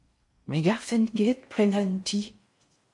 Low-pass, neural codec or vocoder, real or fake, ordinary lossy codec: 10.8 kHz; codec, 16 kHz in and 24 kHz out, 0.6 kbps, FocalCodec, streaming, 4096 codes; fake; MP3, 48 kbps